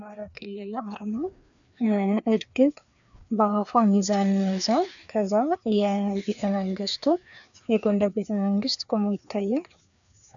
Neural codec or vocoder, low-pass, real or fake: codec, 16 kHz, 2 kbps, FreqCodec, larger model; 7.2 kHz; fake